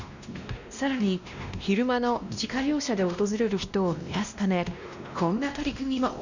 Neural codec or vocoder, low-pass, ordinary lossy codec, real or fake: codec, 16 kHz, 1 kbps, X-Codec, WavLM features, trained on Multilingual LibriSpeech; 7.2 kHz; none; fake